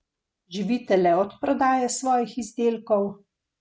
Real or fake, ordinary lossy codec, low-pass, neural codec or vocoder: real; none; none; none